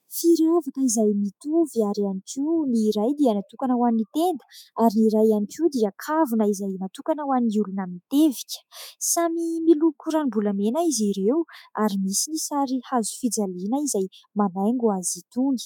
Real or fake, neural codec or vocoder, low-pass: fake; autoencoder, 48 kHz, 128 numbers a frame, DAC-VAE, trained on Japanese speech; 19.8 kHz